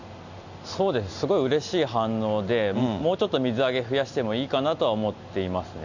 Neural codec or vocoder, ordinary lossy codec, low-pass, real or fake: none; none; 7.2 kHz; real